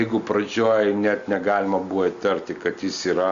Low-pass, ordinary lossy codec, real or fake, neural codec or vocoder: 7.2 kHz; Opus, 64 kbps; real; none